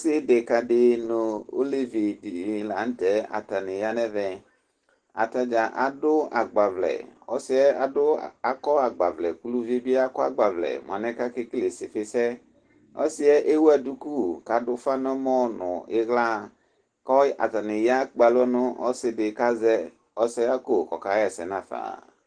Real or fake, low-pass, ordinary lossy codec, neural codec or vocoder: real; 9.9 kHz; Opus, 16 kbps; none